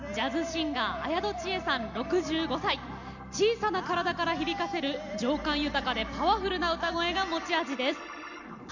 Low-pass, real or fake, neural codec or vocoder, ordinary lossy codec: 7.2 kHz; real; none; none